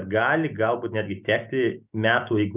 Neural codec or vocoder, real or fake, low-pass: none; real; 3.6 kHz